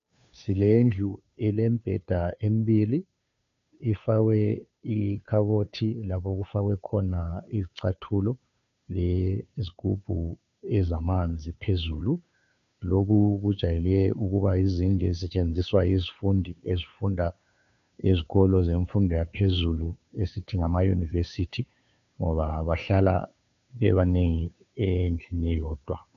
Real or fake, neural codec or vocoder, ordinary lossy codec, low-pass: fake; codec, 16 kHz, 2 kbps, FunCodec, trained on Chinese and English, 25 frames a second; AAC, 96 kbps; 7.2 kHz